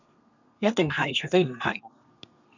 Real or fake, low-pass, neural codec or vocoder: fake; 7.2 kHz; codec, 32 kHz, 1.9 kbps, SNAC